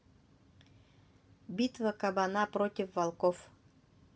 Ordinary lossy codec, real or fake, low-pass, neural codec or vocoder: none; real; none; none